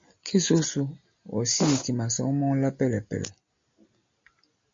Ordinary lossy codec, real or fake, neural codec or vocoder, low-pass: MP3, 48 kbps; real; none; 7.2 kHz